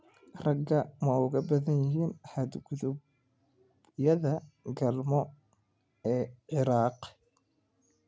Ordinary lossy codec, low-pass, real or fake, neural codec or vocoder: none; none; real; none